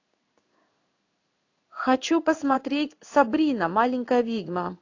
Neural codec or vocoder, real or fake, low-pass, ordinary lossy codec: codec, 16 kHz in and 24 kHz out, 1 kbps, XY-Tokenizer; fake; 7.2 kHz; AAC, 48 kbps